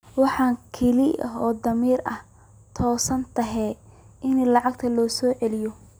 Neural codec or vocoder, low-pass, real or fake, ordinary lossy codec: none; none; real; none